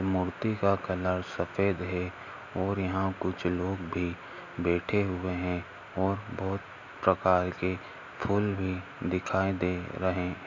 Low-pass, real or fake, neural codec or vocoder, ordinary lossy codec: 7.2 kHz; real; none; none